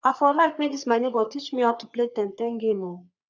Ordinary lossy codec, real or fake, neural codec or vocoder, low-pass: none; fake; codec, 44.1 kHz, 3.4 kbps, Pupu-Codec; 7.2 kHz